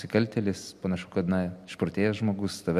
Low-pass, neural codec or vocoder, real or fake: 14.4 kHz; none; real